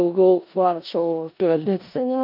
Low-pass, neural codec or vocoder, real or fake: 5.4 kHz; codec, 16 kHz in and 24 kHz out, 0.4 kbps, LongCat-Audio-Codec, four codebook decoder; fake